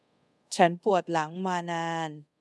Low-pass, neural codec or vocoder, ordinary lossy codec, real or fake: none; codec, 24 kHz, 0.5 kbps, DualCodec; none; fake